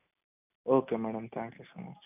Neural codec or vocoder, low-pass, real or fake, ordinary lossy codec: none; 3.6 kHz; real; none